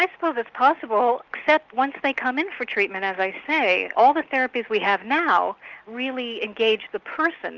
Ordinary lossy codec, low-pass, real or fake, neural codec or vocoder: Opus, 24 kbps; 7.2 kHz; real; none